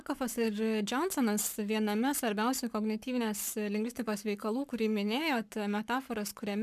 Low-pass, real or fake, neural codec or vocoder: 14.4 kHz; fake; codec, 44.1 kHz, 7.8 kbps, Pupu-Codec